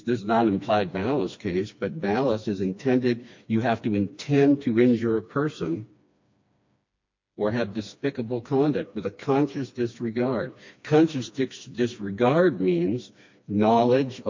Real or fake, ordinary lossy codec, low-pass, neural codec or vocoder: fake; MP3, 48 kbps; 7.2 kHz; codec, 16 kHz, 2 kbps, FreqCodec, smaller model